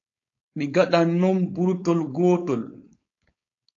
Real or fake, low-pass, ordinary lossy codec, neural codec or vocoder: fake; 7.2 kHz; AAC, 48 kbps; codec, 16 kHz, 4.8 kbps, FACodec